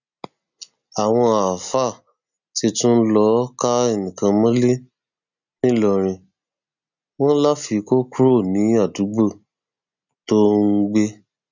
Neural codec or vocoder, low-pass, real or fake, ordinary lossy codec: none; 7.2 kHz; real; none